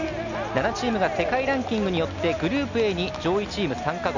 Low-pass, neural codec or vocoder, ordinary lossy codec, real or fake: 7.2 kHz; none; none; real